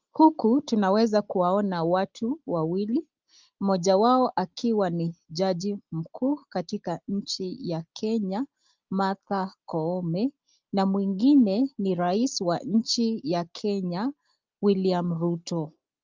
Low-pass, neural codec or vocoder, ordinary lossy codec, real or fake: 7.2 kHz; none; Opus, 32 kbps; real